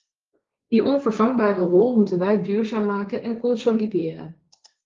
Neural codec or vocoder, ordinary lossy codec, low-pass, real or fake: codec, 16 kHz, 1.1 kbps, Voila-Tokenizer; Opus, 32 kbps; 7.2 kHz; fake